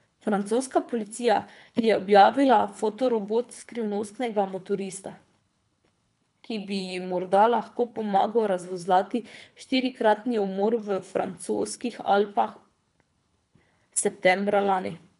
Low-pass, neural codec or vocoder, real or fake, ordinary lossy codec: 10.8 kHz; codec, 24 kHz, 3 kbps, HILCodec; fake; none